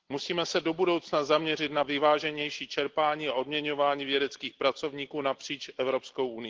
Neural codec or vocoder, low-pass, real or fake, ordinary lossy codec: none; 7.2 kHz; real; Opus, 16 kbps